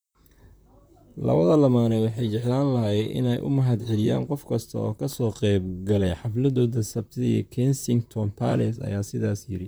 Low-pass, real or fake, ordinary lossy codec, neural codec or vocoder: none; fake; none; vocoder, 44.1 kHz, 128 mel bands, Pupu-Vocoder